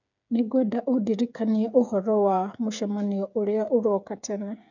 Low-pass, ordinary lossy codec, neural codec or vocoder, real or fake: 7.2 kHz; none; codec, 16 kHz, 8 kbps, FreqCodec, smaller model; fake